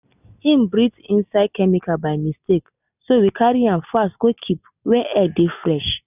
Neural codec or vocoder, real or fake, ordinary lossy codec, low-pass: none; real; none; 3.6 kHz